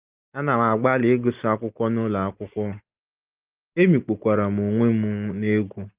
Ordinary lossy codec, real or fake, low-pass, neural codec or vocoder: Opus, 32 kbps; real; 3.6 kHz; none